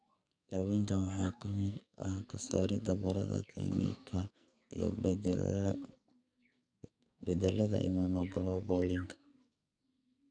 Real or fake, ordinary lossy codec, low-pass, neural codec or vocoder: fake; none; 9.9 kHz; codec, 44.1 kHz, 2.6 kbps, SNAC